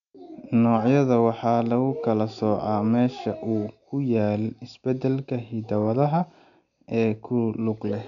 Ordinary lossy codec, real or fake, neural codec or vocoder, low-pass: none; real; none; 7.2 kHz